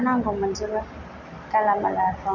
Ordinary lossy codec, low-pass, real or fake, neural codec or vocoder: none; 7.2 kHz; real; none